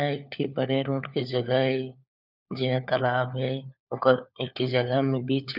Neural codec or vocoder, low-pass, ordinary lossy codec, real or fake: codec, 16 kHz, 4 kbps, FunCodec, trained on LibriTTS, 50 frames a second; 5.4 kHz; none; fake